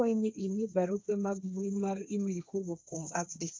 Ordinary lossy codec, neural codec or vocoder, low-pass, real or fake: none; codec, 16 kHz, 1.1 kbps, Voila-Tokenizer; none; fake